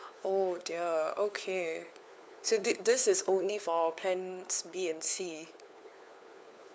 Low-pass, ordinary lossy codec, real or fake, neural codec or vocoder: none; none; fake; codec, 16 kHz, 4 kbps, FunCodec, trained on LibriTTS, 50 frames a second